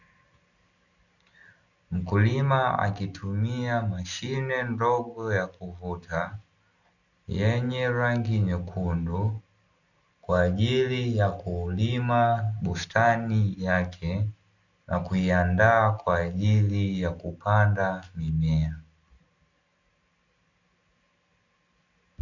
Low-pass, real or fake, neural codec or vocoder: 7.2 kHz; real; none